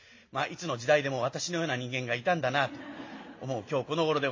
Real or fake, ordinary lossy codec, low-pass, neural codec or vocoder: real; MP3, 32 kbps; 7.2 kHz; none